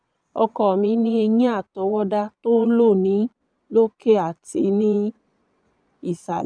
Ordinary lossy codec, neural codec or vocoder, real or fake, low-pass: none; vocoder, 22.05 kHz, 80 mel bands, Vocos; fake; 9.9 kHz